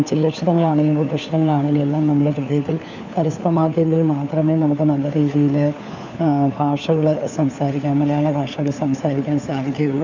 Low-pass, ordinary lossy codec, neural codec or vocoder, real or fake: 7.2 kHz; none; codec, 16 kHz, 4 kbps, FunCodec, trained on LibriTTS, 50 frames a second; fake